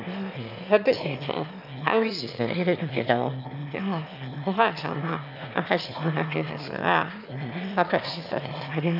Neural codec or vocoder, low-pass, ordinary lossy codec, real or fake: autoencoder, 22.05 kHz, a latent of 192 numbers a frame, VITS, trained on one speaker; 5.4 kHz; none; fake